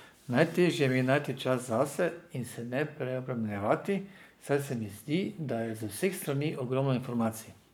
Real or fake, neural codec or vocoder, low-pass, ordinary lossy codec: fake; codec, 44.1 kHz, 7.8 kbps, Pupu-Codec; none; none